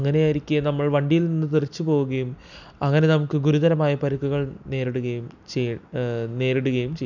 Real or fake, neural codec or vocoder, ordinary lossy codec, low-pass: real; none; none; 7.2 kHz